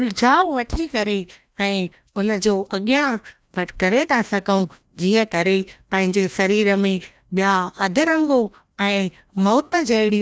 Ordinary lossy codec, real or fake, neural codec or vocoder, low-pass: none; fake; codec, 16 kHz, 1 kbps, FreqCodec, larger model; none